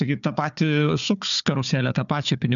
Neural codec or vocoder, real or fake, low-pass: codec, 16 kHz, 4 kbps, FunCodec, trained on Chinese and English, 50 frames a second; fake; 7.2 kHz